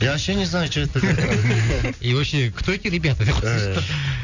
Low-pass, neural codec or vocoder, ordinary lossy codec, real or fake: 7.2 kHz; none; none; real